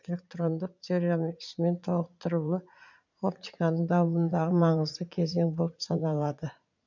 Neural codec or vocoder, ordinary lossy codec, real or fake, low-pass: vocoder, 44.1 kHz, 80 mel bands, Vocos; none; fake; 7.2 kHz